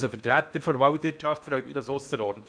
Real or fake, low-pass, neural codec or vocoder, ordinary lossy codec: fake; 9.9 kHz; codec, 16 kHz in and 24 kHz out, 0.8 kbps, FocalCodec, streaming, 65536 codes; none